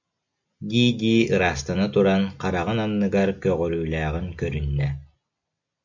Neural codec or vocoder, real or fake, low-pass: none; real; 7.2 kHz